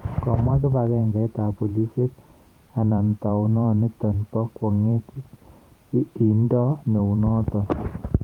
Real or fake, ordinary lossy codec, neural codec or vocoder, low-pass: fake; Opus, 32 kbps; vocoder, 44.1 kHz, 128 mel bands every 512 samples, BigVGAN v2; 19.8 kHz